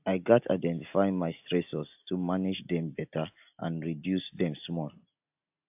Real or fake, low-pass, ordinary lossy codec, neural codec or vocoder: real; 3.6 kHz; AAC, 32 kbps; none